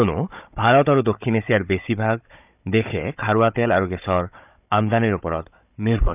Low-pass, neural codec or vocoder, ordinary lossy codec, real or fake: 3.6 kHz; codec, 16 kHz, 16 kbps, FunCodec, trained on Chinese and English, 50 frames a second; none; fake